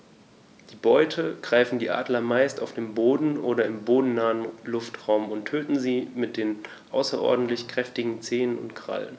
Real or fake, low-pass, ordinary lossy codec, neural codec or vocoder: real; none; none; none